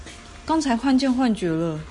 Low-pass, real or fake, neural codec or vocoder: 10.8 kHz; real; none